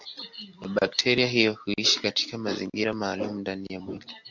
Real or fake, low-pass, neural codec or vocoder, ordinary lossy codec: real; 7.2 kHz; none; MP3, 64 kbps